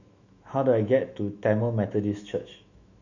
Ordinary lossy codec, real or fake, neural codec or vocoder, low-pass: none; fake; autoencoder, 48 kHz, 128 numbers a frame, DAC-VAE, trained on Japanese speech; 7.2 kHz